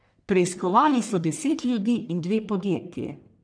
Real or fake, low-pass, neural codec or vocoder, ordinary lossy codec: fake; 9.9 kHz; codec, 44.1 kHz, 1.7 kbps, Pupu-Codec; none